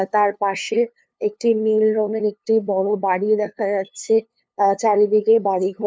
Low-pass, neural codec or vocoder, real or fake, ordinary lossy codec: none; codec, 16 kHz, 2 kbps, FunCodec, trained on LibriTTS, 25 frames a second; fake; none